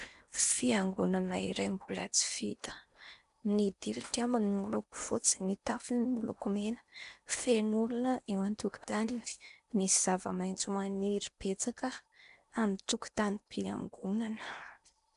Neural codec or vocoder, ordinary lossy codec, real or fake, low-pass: codec, 16 kHz in and 24 kHz out, 0.8 kbps, FocalCodec, streaming, 65536 codes; MP3, 96 kbps; fake; 10.8 kHz